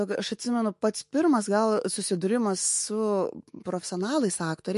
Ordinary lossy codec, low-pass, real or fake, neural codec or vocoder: MP3, 48 kbps; 14.4 kHz; fake; autoencoder, 48 kHz, 128 numbers a frame, DAC-VAE, trained on Japanese speech